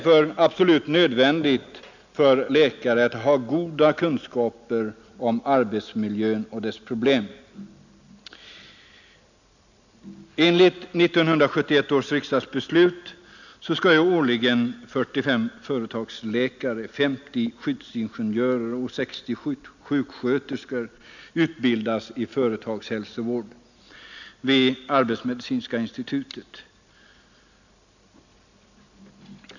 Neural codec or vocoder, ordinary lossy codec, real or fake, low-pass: none; none; real; 7.2 kHz